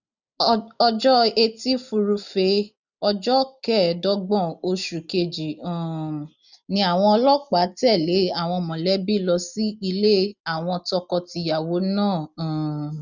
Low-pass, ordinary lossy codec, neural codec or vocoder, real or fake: 7.2 kHz; none; none; real